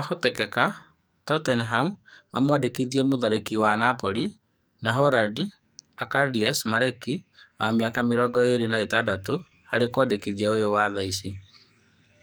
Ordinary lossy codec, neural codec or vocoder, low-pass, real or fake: none; codec, 44.1 kHz, 2.6 kbps, SNAC; none; fake